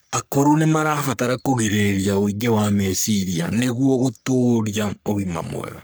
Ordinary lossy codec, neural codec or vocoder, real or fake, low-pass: none; codec, 44.1 kHz, 3.4 kbps, Pupu-Codec; fake; none